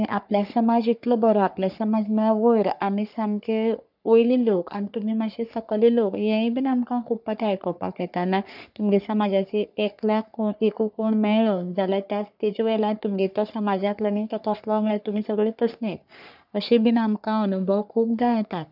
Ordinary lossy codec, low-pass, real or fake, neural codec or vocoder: none; 5.4 kHz; fake; codec, 44.1 kHz, 3.4 kbps, Pupu-Codec